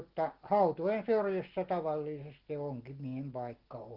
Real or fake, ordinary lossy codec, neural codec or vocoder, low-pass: real; Opus, 16 kbps; none; 5.4 kHz